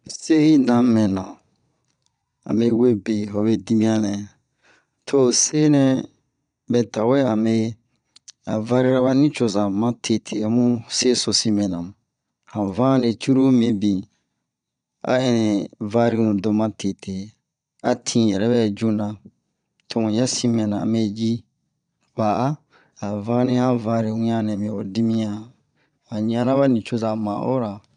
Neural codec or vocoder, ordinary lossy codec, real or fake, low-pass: vocoder, 22.05 kHz, 80 mel bands, Vocos; none; fake; 9.9 kHz